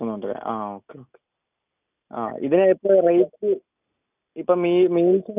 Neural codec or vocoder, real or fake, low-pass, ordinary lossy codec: none; real; 3.6 kHz; none